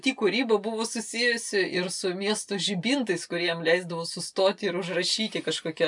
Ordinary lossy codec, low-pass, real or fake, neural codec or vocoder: MP3, 96 kbps; 10.8 kHz; real; none